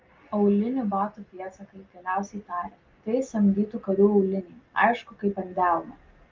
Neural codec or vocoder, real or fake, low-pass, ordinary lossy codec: none; real; 7.2 kHz; Opus, 32 kbps